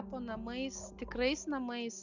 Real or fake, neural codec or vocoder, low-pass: real; none; 7.2 kHz